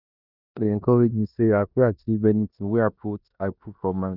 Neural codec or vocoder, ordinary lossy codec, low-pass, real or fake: codec, 16 kHz in and 24 kHz out, 0.9 kbps, LongCat-Audio-Codec, four codebook decoder; none; 5.4 kHz; fake